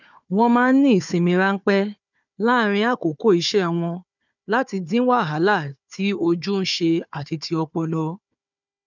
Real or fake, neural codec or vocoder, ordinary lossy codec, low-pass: fake; codec, 16 kHz, 4 kbps, FunCodec, trained on Chinese and English, 50 frames a second; none; 7.2 kHz